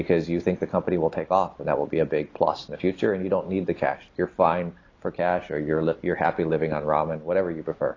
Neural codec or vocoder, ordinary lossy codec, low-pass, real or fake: none; AAC, 32 kbps; 7.2 kHz; real